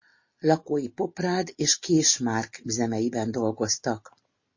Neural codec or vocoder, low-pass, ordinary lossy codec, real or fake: none; 7.2 kHz; MP3, 32 kbps; real